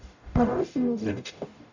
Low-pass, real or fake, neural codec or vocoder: 7.2 kHz; fake; codec, 44.1 kHz, 0.9 kbps, DAC